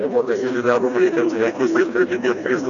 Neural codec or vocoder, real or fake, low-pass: codec, 16 kHz, 1 kbps, FreqCodec, smaller model; fake; 7.2 kHz